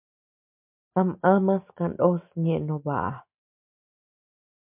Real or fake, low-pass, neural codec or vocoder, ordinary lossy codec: real; 3.6 kHz; none; MP3, 32 kbps